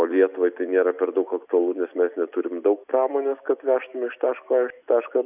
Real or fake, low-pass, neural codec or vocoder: real; 3.6 kHz; none